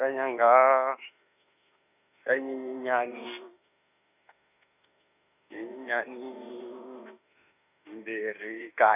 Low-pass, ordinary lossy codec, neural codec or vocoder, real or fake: 3.6 kHz; none; autoencoder, 48 kHz, 32 numbers a frame, DAC-VAE, trained on Japanese speech; fake